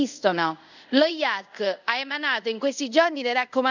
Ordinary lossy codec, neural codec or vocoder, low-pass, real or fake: none; codec, 24 kHz, 0.5 kbps, DualCodec; 7.2 kHz; fake